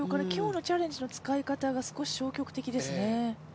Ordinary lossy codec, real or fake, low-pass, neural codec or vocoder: none; real; none; none